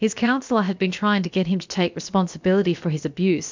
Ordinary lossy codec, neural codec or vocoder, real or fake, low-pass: MP3, 64 kbps; codec, 16 kHz, about 1 kbps, DyCAST, with the encoder's durations; fake; 7.2 kHz